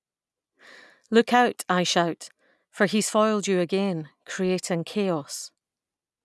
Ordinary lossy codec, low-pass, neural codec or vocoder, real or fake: none; none; none; real